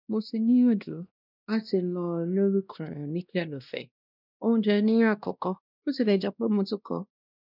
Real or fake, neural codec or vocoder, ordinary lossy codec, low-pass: fake; codec, 16 kHz, 1 kbps, X-Codec, WavLM features, trained on Multilingual LibriSpeech; none; 5.4 kHz